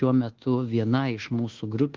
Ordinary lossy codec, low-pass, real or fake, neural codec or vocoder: Opus, 16 kbps; 7.2 kHz; fake; codec, 24 kHz, 1.2 kbps, DualCodec